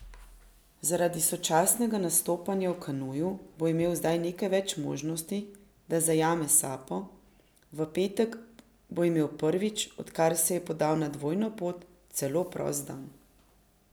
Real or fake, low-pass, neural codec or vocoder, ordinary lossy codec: real; none; none; none